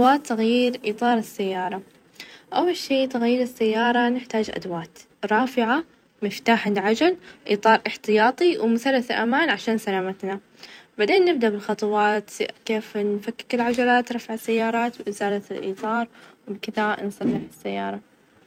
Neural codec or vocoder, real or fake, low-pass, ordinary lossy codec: vocoder, 44.1 kHz, 128 mel bands every 256 samples, BigVGAN v2; fake; 19.8 kHz; none